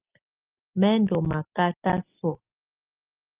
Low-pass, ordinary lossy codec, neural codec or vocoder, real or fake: 3.6 kHz; Opus, 24 kbps; none; real